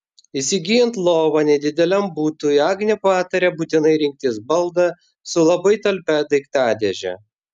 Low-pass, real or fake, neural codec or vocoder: 10.8 kHz; real; none